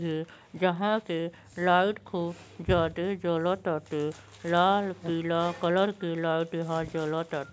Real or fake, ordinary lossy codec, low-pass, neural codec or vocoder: real; none; none; none